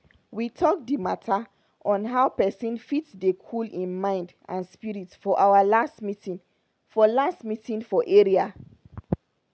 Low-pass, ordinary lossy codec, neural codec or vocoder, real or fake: none; none; none; real